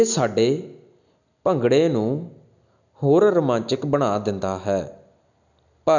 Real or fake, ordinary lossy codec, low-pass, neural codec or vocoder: real; none; 7.2 kHz; none